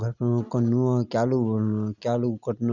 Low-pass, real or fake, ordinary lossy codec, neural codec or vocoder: 7.2 kHz; real; none; none